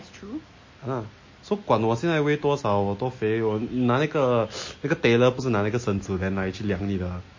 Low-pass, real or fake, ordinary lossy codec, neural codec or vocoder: 7.2 kHz; real; MP3, 32 kbps; none